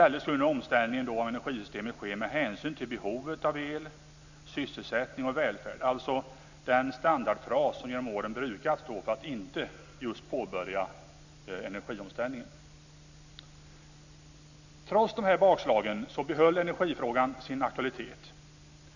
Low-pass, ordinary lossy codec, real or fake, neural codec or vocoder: 7.2 kHz; none; real; none